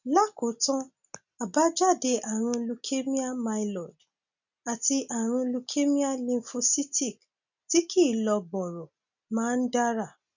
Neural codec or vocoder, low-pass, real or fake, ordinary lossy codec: none; 7.2 kHz; real; none